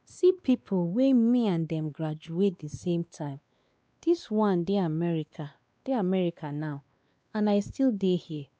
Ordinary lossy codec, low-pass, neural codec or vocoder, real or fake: none; none; codec, 16 kHz, 2 kbps, X-Codec, WavLM features, trained on Multilingual LibriSpeech; fake